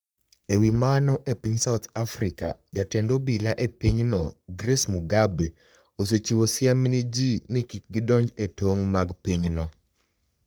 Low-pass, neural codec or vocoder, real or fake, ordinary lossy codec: none; codec, 44.1 kHz, 3.4 kbps, Pupu-Codec; fake; none